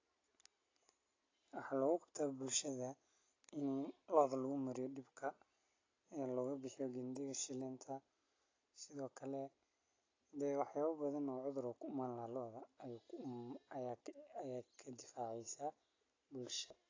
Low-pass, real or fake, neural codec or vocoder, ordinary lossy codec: 7.2 kHz; real; none; AAC, 32 kbps